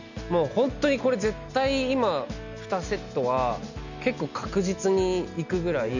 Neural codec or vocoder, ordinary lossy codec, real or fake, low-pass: none; none; real; 7.2 kHz